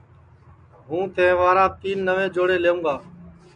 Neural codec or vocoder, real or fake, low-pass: none; real; 9.9 kHz